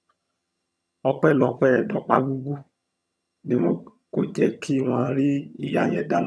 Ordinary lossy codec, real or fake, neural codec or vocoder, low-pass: none; fake; vocoder, 22.05 kHz, 80 mel bands, HiFi-GAN; none